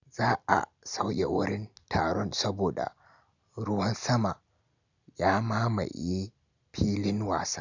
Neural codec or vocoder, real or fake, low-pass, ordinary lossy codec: none; real; 7.2 kHz; none